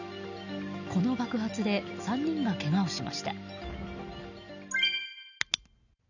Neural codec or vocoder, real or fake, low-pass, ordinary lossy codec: none; real; 7.2 kHz; none